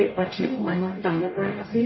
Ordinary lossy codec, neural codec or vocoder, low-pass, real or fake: MP3, 24 kbps; codec, 44.1 kHz, 0.9 kbps, DAC; 7.2 kHz; fake